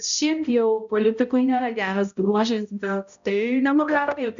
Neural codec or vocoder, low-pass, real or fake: codec, 16 kHz, 0.5 kbps, X-Codec, HuBERT features, trained on balanced general audio; 7.2 kHz; fake